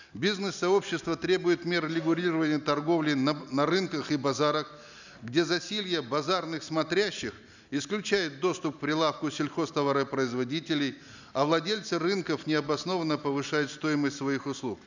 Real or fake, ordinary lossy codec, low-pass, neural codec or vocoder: real; none; 7.2 kHz; none